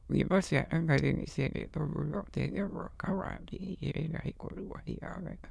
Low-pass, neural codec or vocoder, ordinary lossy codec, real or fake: none; autoencoder, 22.05 kHz, a latent of 192 numbers a frame, VITS, trained on many speakers; none; fake